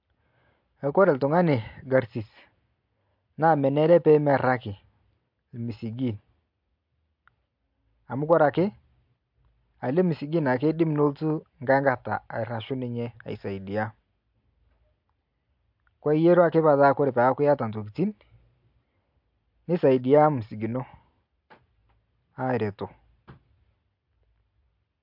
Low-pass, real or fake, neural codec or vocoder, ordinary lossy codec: 5.4 kHz; real; none; MP3, 48 kbps